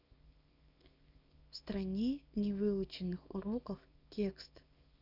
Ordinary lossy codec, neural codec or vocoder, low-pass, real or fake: none; codec, 24 kHz, 0.9 kbps, WavTokenizer, small release; 5.4 kHz; fake